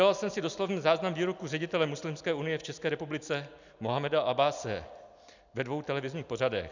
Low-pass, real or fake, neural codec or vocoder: 7.2 kHz; real; none